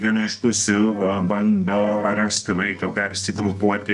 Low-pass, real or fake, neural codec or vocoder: 10.8 kHz; fake; codec, 24 kHz, 0.9 kbps, WavTokenizer, medium music audio release